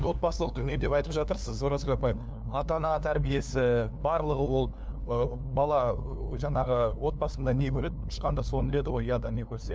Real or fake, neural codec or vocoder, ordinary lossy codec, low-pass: fake; codec, 16 kHz, 2 kbps, FunCodec, trained on LibriTTS, 25 frames a second; none; none